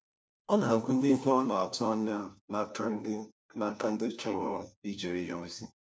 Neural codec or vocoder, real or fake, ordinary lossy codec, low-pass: codec, 16 kHz, 1 kbps, FunCodec, trained on LibriTTS, 50 frames a second; fake; none; none